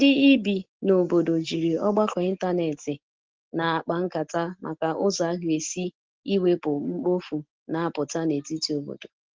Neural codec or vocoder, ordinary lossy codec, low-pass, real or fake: none; Opus, 16 kbps; 7.2 kHz; real